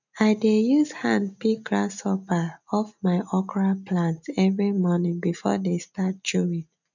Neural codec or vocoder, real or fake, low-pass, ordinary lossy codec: none; real; 7.2 kHz; none